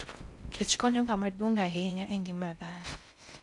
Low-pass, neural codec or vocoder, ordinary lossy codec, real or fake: 10.8 kHz; codec, 16 kHz in and 24 kHz out, 0.6 kbps, FocalCodec, streaming, 4096 codes; none; fake